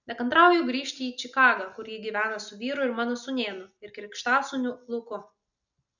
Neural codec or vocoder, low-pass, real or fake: none; 7.2 kHz; real